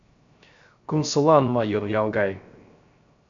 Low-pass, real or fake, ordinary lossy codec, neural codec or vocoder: 7.2 kHz; fake; MP3, 64 kbps; codec, 16 kHz, 0.3 kbps, FocalCodec